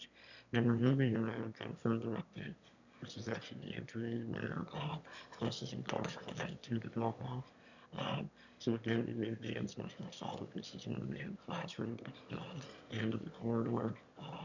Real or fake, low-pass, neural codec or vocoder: fake; 7.2 kHz; autoencoder, 22.05 kHz, a latent of 192 numbers a frame, VITS, trained on one speaker